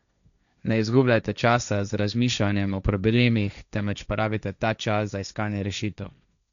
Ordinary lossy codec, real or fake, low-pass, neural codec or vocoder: none; fake; 7.2 kHz; codec, 16 kHz, 1.1 kbps, Voila-Tokenizer